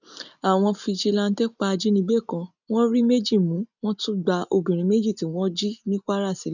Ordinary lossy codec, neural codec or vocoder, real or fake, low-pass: none; none; real; 7.2 kHz